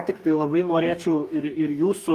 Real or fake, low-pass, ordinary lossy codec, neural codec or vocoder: fake; 14.4 kHz; Opus, 32 kbps; codec, 44.1 kHz, 2.6 kbps, DAC